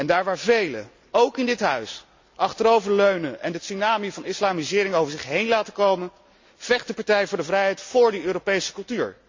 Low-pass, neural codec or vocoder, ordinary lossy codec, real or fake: 7.2 kHz; none; MP3, 64 kbps; real